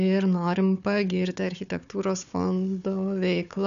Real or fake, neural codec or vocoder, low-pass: fake; codec, 16 kHz, 4 kbps, FunCodec, trained on Chinese and English, 50 frames a second; 7.2 kHz